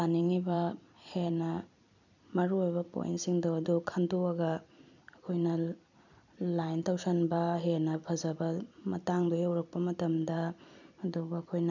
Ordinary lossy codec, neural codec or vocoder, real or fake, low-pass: none; vocoder, 44.1 kHz, 128 mel bands every 256 samples, BigVGAN v2; fake; 7.2 kHz